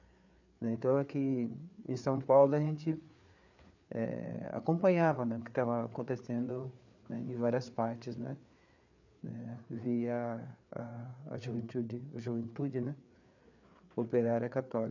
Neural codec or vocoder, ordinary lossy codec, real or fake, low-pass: codec, 16 kHz, 4 kbps, FreqCodec, larger model; none; fake; 7.2 kHz